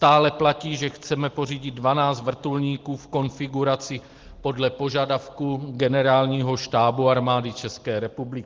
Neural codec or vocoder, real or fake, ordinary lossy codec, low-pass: none; real; Opus, 16 kbps; 7.2 kHz